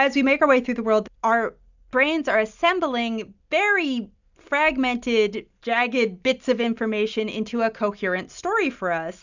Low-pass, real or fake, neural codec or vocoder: 7.2 kHz; real; none